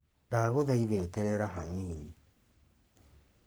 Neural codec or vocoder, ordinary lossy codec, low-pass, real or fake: codec, 44.1 kHz, 3.4 kbps, Pupu-Codec; none; none; fake